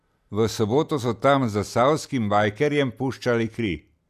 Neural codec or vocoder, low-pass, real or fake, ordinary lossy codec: none; 14.4 kHz; real; none